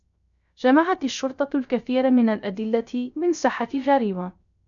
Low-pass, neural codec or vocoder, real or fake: 7.2 kHz; codec, 16 kHz, 0.3 kbps, FocalCodec; fake